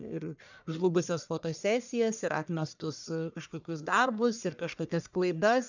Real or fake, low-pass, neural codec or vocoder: fake; 7.2 kHz; codec, 44.1 kHz, 1.7 kbps, Pupu-Codec